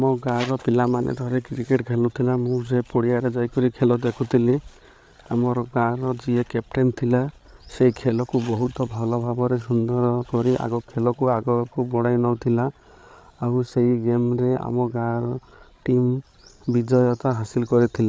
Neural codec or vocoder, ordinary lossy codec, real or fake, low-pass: codec, 16 kHz, 8 kbps, FreqCodec, larger model; none; fake; none